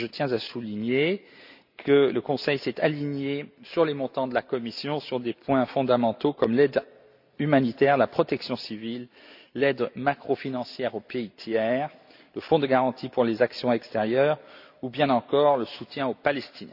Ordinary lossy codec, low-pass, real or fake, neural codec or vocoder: AAC, 48 kbps; 5.4 kHz; real; none